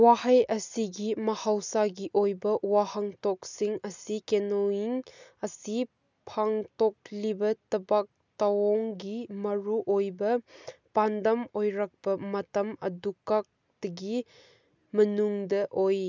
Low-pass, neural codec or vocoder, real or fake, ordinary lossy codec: 7.2 kHz; none; real; none